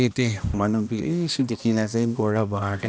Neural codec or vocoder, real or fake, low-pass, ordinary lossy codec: codec, 16 kHz, 1 kbps, X-Codec, HuBERT features, trained on balanced general audio; fake; none; none